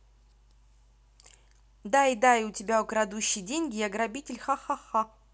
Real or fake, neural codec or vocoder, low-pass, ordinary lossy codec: real; none; none; none